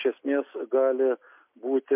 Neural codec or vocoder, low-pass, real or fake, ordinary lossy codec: none; 3.6 kHz; real; MP3, 32 kbps